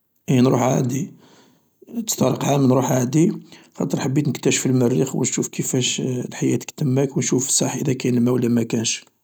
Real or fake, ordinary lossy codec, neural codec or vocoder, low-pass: real; none; none; none